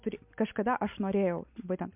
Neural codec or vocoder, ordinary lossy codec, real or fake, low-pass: none; MP3, 32 kbps; real; 3.6 kHz